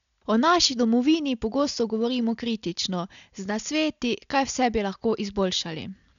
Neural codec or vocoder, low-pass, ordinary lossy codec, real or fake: none; 7.2 kHz; none; real